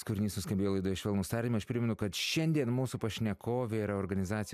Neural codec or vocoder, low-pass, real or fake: none; 14.4 kHz; real